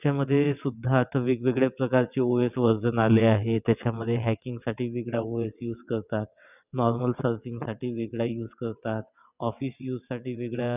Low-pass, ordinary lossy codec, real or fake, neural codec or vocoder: 3.6 kHz; none; fake; vocoder, 22.05 kHz, 80 mel bands, WaveNeXt